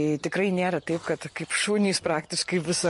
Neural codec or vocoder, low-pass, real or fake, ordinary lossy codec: none; 14.4 kHz; real; MP3, 48 kbps